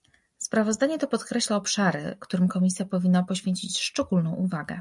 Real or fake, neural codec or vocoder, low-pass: real; none; 10.8 kHz